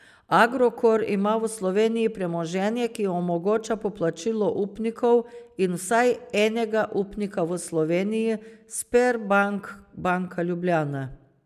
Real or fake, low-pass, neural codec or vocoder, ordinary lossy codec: real; 14.4 kHz; none; none